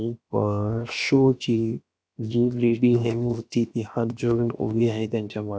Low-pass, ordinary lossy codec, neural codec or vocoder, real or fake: none; none; codec, 16 kHz, 0.7 kbps, FocalCodec; fake